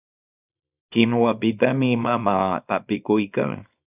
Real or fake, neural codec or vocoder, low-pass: fake; codec, 24 kHz, 0.9 kbps, WavTokenizer, small release; 3.6 kHz